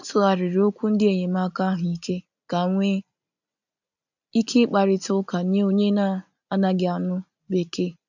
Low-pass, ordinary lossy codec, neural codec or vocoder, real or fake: 7.2 kHz; none; none; real